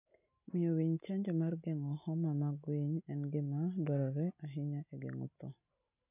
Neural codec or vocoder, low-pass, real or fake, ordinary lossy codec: none; 3.6 kHz; real; none